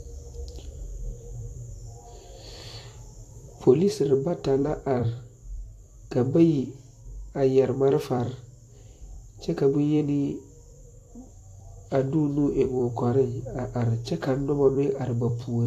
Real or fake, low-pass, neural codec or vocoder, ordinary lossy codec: fake; 14.4 kHz; vocoder, 44.1 kHz, 128 mel bands every 256 samples, BigVGAN v2; AAC, 64 kbps